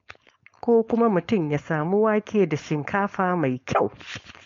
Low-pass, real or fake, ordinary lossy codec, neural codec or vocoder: 7.2 kHz; fake; MP3, 48 kbps; codec, 16 kHz, 4.8 kbps, FACodec